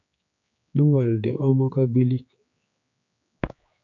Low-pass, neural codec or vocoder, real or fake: 7.2 kHz; codec, 16 kHz, 4 kbps, X-Codec, HuBERT features, trained on general audio; fake